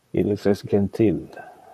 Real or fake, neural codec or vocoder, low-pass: fake; vocoder, 44.1 kHz, 128 mel bands, Pupu-Vocoder; 14.4 kHz